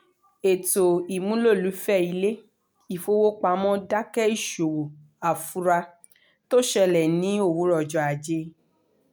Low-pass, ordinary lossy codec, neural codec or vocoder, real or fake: none; none; none; real